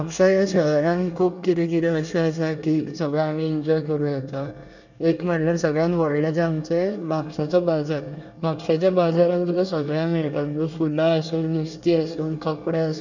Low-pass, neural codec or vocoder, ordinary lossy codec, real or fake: 7.2 kHz; codec, 24 kHz, 1 kbps, SNAC; none; fake